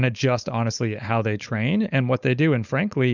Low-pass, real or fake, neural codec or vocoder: 7.2 kHz; fake; codec, 16 kHz, 4.8 kbps, FACodec